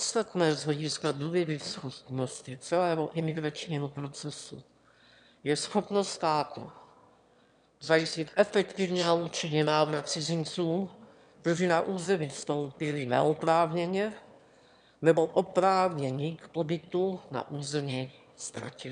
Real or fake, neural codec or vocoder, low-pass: fake; autoencoder, 22.05 kHz, a latent of 192 numbers a frame, VITS, trained on one speaker; 9.9 kHz